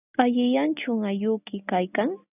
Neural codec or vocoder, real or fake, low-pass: none; real; 3.6 kHz